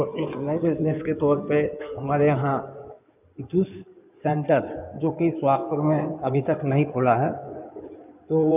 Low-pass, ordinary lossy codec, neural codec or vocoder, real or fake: 3.6 kHz; none; codec, 16 kHz in and 24 kHz out, 2.2 kbps, FireRedTTS-2 codec; fake